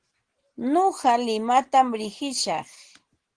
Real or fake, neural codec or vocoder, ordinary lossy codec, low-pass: real; none; Opus, 16 kbps; 9.9 kHz